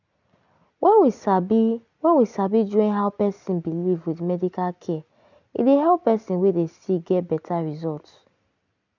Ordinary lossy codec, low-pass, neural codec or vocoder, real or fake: none; 7.2 kHz; none; real